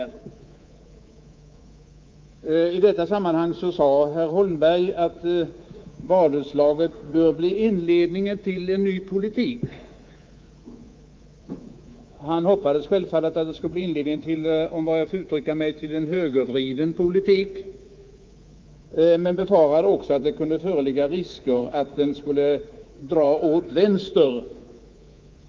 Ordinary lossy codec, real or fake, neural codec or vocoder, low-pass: Opus, 24 kbps; fake; codec, 24 kHz, 3.1 kbps, DualCodec; 7.2 kHz